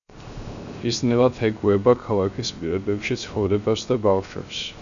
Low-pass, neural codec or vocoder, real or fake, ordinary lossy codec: 7.2 kHz; codec, 16 kHz, 0.3 kbps, FocalCodec; fake; MP3, 96 kbps